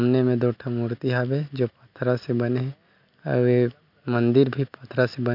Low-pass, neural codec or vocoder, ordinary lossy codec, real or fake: 5.4 kHz; none; none; real